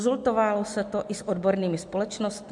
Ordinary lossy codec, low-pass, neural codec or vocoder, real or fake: MP3, 64 kbps; 10.8 kHz; none; real